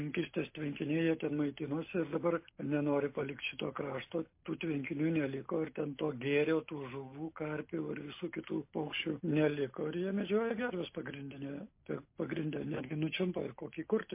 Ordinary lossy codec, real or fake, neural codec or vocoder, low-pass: MP3, 24 kbps; real; none; 3.6 kHz